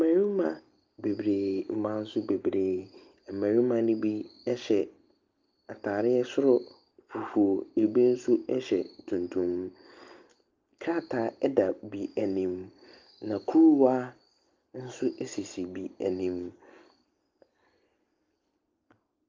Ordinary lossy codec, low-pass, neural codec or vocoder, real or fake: Opus, 32 kbps; 7.2 kHz; none; real